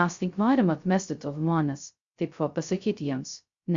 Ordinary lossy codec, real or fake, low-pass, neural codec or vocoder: Opus, 64 kbps; fake; 7.2 kHz; codec, 16 kHz, 0.2 kbps, FocalCodec